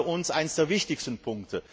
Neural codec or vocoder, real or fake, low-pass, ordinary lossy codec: none; real; none; none